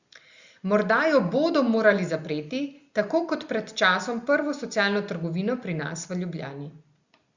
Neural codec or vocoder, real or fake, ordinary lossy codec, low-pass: none; real; Opus, 64 kbps; 7.2 kHz